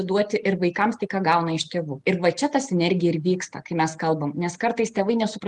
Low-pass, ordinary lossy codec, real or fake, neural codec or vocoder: 10.8 kHz; Opus, 24 kbps; real; none